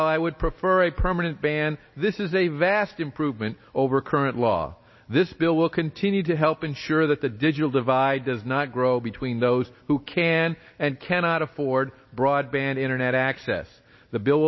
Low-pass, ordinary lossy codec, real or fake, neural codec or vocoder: 7.2 kHz; MP3, 24 kbps; real; none